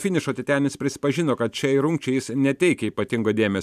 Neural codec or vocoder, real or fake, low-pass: vocoder, 44.1 kHz, 128 mel bands every 512 samples, BigVGAN v2; fake; 14.4 kHz